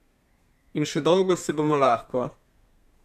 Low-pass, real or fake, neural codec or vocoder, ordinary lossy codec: 14.4 kHz; fake; codec, 32 kHz, 1.9 kbps, SNAC; none